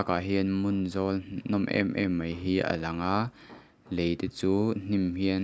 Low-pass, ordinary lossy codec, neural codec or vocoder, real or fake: none; none; none; real